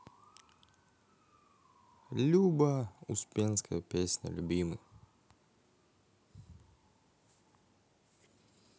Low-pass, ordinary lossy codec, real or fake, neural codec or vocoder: none; none; real; none